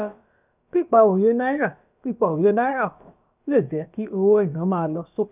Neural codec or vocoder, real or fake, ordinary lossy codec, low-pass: codec, 16 kHz, about 1 kbps, DyCAST, with the encoder's durations; fake; none; 3.6 kHz